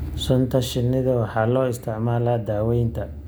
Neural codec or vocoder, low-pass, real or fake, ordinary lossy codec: none; none; real; none